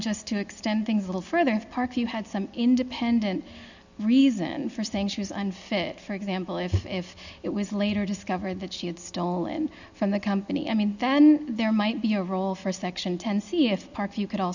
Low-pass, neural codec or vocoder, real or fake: 7.2 kHz; none; real